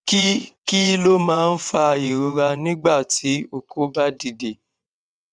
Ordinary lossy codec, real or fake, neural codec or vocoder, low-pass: none; fake; vocoder, 22.05 kHz, 80 mel bands, WaveNeXt; 9.9 kHz